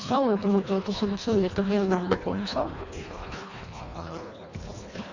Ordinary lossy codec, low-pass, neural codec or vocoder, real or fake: Opus, 64 kbps; 7.2 kHz; codec, 24 kHz, 1.5 kbps, HILCodec; fake